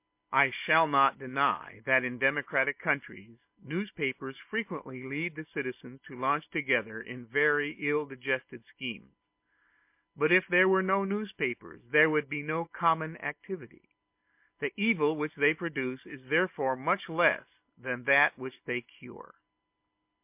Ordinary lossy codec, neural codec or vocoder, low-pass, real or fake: MP3, 32 kbps; none; 3.6 kHz; real